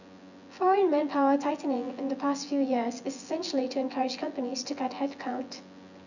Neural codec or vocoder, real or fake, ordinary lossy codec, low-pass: vocoder, 24 kHz, 100 mel bands, Vocos; fake; none; 7.2 kHz